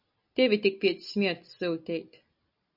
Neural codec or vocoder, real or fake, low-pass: none; real; 5.4 kHz